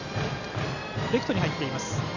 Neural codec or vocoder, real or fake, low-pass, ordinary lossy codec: none; real; 7.2 kHz; none